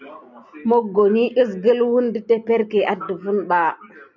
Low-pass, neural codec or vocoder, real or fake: 7.2 kHz; none; real